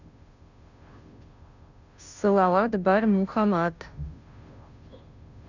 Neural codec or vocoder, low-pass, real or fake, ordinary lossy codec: codec, 16 kHz, 0.5 kbps, FunCodec, trained on Chinese and English, 25 frames a second; 7.2 kHz; fake; none